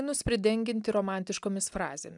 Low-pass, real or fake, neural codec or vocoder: 10.8 kHz; real; none